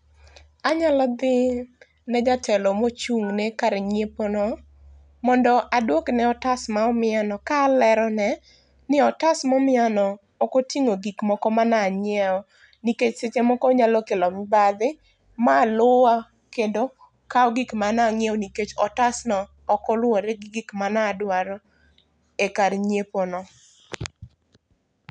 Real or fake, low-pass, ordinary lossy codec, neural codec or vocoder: real; 9.9 kHz; none; none